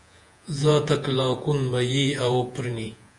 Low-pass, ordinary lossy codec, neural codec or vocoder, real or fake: 10.8 kHz; AAC, 64 kbps; vocoder, 48 kHz, 128 mel bands, Vocos; fake